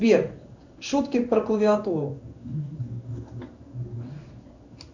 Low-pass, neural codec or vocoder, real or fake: 7.2 kHz; codec, 16 kHz in and 24 kHz out, 1 kbps, XY-Tokenizer; fake